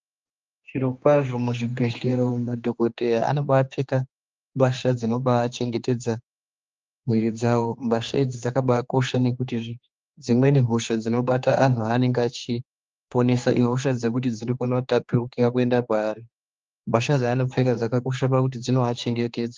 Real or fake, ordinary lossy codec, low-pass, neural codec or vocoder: fake; Opus, 24 kbps; 7.2 kHz; codec, 16 kHz, 2 kbps, X-Codec, HuBERT features, trained on general audio